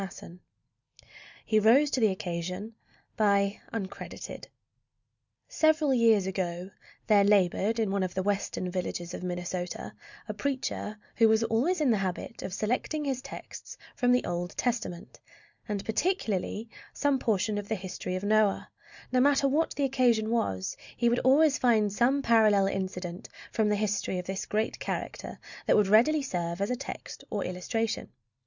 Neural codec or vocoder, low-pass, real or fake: none; 7.2 kHz; real